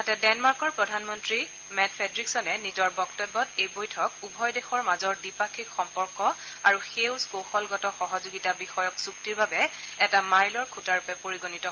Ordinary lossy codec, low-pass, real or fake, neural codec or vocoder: Opus, 16 kbps; 7.2 kHz; real; none